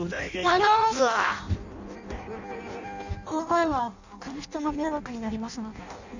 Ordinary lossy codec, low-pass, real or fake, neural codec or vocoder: none; 7.2 kHz; fake; codec, 16 kHz in and 24 kHz out, 0.6 kbps, FireRedTTS-2 codec